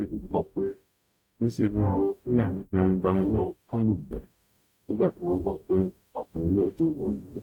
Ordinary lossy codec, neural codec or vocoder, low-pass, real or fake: none; codec, 44.1 kHz, 0.9 kbps, DAC; 19.8 kHz; fake